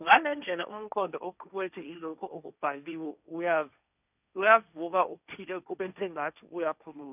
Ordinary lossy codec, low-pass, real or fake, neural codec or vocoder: none; 3.6 kHz; fake; codec, 16 kHz, 1.1 kbps, Voila-Tokenizer